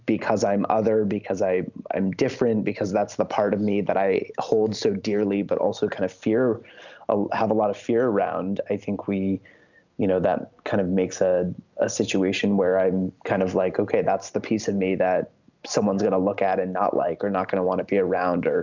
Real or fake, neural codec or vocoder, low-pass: real; none; 7.2 kHz